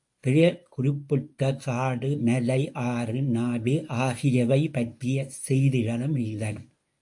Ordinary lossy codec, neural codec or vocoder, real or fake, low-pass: AAC, 64 kbps; codec, 24 kHz, 0.9 kbps, WavTokenizer, medium speech release version 1; fake; 10.8 kHz